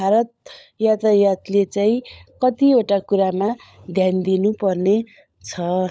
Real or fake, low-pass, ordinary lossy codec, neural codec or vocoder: fake; none; none; codec, 16 kHz, 16 kbps, FunCodec, trained on LibriTTS, 50 frames a second